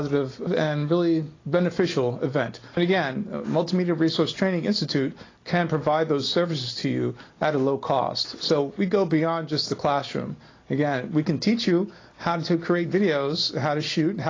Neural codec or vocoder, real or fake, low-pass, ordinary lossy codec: none; real; 7.2 kHz; AAC, 32 kbps